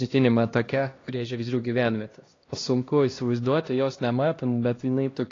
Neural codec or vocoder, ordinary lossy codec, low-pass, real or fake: codec, 16 kHz, 1 kbps, X-Codec, HuBERT features, trained on LibriSpeech; AAC, 32 kbps; 7.2 kHz; fake